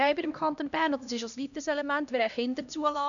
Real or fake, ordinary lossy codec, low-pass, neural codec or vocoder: fake; none; 7.2 kHz; codec, 16 kHz, 1 kbps, X-Codec, HuBERT features, trained on LibriSpeech